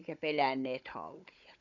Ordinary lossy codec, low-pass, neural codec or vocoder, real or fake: none; 7.2 kHz; codec, 16 kHz, 16 kbps, FunCodec, trained on Chinese and English, 50 frames a second; fake